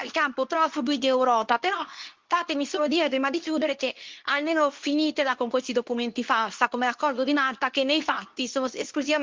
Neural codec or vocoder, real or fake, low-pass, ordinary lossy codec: codec, 24 kHz, 0.9 kbps, WavTokenizer, medium speech release version 2; fake; 7.2 kHz; Opus, 32 kbps